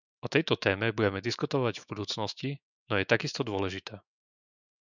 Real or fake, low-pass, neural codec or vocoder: fake; 7.2 kHz; autoencoder, 48 kHz, 128 numbers a frame, DAC-VAE, trained on Japanese speech